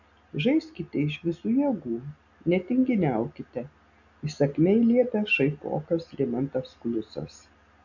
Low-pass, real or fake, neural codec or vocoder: 7.2 kHz; real; none